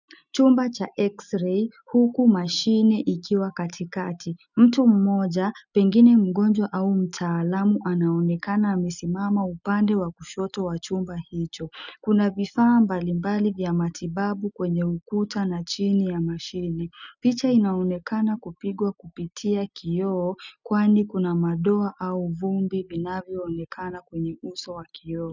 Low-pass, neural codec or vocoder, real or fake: 7.2 kHz; none; real